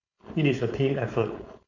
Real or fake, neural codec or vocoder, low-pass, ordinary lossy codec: fake; codec, 16 kHz, 4.8 kbps, FACodec; 7.2 kHz; none